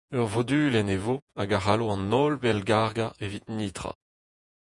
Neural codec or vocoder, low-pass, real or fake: vocoder, 48 kHz, 128 mel bands, Vocos; 10.8 kHz; fake